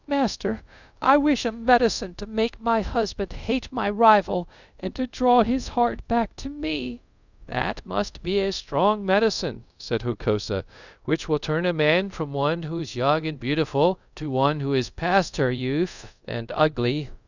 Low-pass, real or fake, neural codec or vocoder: 7.2 kHz; fake; codec, 24 kHz, 0.5 kbps, DualCodec